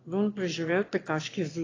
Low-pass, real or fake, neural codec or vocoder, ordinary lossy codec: 7.2 kHz; fake; autoencoder, 22.05 kHz, a latent of 192 numbers a frame, VITS, trained on one speaker; AAC, 32 kbps